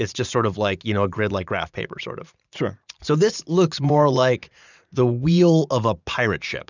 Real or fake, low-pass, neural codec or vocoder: fake; 7.2 kHz; vocoder, 44.1 kHz, 80 mel bands, Vocos